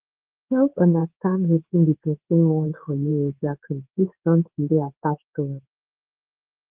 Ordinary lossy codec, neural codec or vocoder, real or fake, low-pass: Opus, 16 kbps; codec, 16 kHz in and 24 kHz out, 1 kbps, XY-Tokenizer; fake; 3.6 kHz